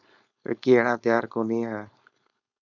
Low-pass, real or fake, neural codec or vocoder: 7.2 kHz; fake; codec, 16 kHz, 4.8 kbps, FACodec